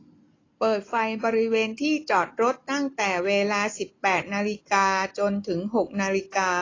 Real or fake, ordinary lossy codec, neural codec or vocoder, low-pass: real; AAC, 32 kbps; none; 7.2 kHz